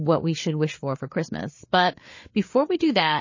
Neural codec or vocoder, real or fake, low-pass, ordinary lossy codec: codec, 16 kHz, 4 kbps, FreqCodec, larger model; fake; 7.2 kHz; MP3, 32 kbps